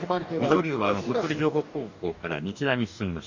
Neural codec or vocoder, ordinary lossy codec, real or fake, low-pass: codec, 44.1 kHz, 2.6 kbps, DAC; MP3, 64 kbps; fake; 7.2 kHz